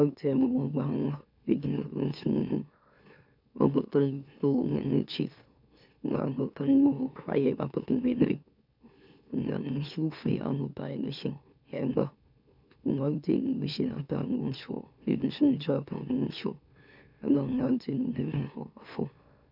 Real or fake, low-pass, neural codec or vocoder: fake; 5.4 kHz; autoencoder, 44.1 kHz, a latent of 192 numbers a frame, MeloTTS